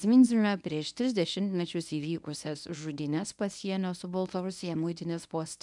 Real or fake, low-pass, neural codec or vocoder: fake; 10.8 kHz; codec, 24 kHz, 0.9 kbps, WavTokenizer, medium speech release version 1